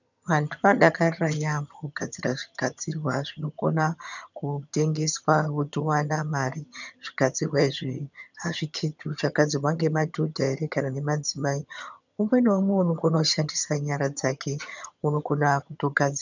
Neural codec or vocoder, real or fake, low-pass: vocoder, 22.05 kHz, 80 mel bands, HiFi-GAN; fake; 7.2 kHz